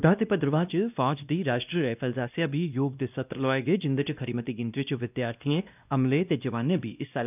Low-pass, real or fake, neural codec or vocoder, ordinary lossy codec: 3.6 kHz; fake; codec, 16 kHz, 1 kbps, X-Codec, WavLM features, trained on Multilingual LibriSpeech; none